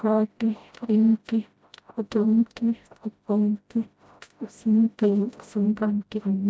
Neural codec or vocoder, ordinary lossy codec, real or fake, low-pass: codec, 16 kHz, 0.5 kbps, FreqCodec, smaller model; none; fake; none